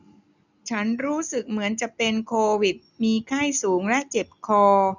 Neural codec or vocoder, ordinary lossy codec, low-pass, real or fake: none; none; 7.2 kHz; real